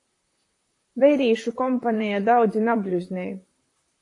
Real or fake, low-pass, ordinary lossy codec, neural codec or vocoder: fake; 10.8 kHz; AAC, 48 kbps; vocoder, 44.1 kHz, 128 mel bands, Pupu-Vocoder